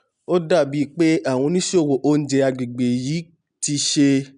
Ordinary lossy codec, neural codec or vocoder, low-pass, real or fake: none; none; 9.9 kHz; real